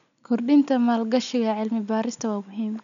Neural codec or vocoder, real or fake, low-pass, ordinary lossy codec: none; real; 7.2 kHz; MP3, 96 kbps